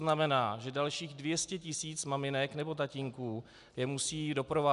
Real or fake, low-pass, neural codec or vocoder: real; 10.8 kHz; none